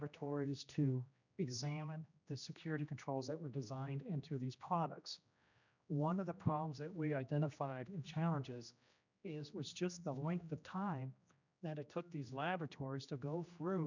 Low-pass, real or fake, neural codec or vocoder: 7.2 kHz; fake; codec, 16 kHz, 1 kbps, X-Codec, HuBERT features, trained on general audio